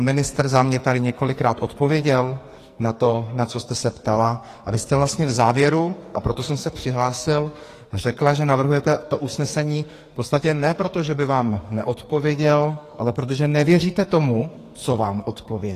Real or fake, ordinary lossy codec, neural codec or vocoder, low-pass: fake; AAC, 48 kbps; codec, 44.1 kHz, 2.6 kbps, SNAC; 14.4 kHz